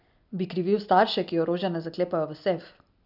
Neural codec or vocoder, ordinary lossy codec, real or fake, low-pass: none; none; real; 5.4 kHz